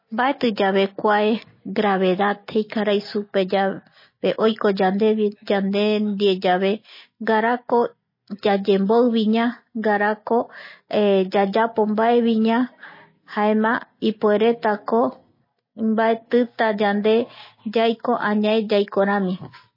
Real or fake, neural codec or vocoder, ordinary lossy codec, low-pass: real; none; MP3, 24 kbps; 5.4 kHz